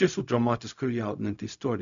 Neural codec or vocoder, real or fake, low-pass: codec, 16 kHz, 0.4 kbps, LongCat-Audio-Codec; fake; 7.2 kHz